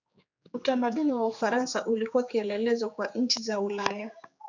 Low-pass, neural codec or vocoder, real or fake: 7.2 kHz; codec, 16 kHz, 4 kbps, X-Codec, HuBERT features, trained on general audio; fake